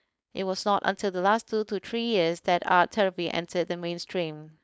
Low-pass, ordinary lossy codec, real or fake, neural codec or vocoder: none; none; fake; codec, 16 kHz, 4.8 kbps, FACodec